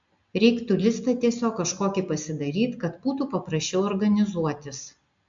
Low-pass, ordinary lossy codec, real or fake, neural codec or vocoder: 7.2 kHz; AAC, 64 kbps; real; none